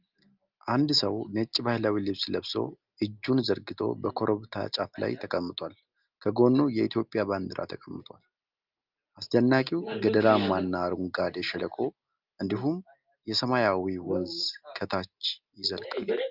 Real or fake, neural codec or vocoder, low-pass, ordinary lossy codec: real; none; 5.4 kHz; Opus, 24 kbps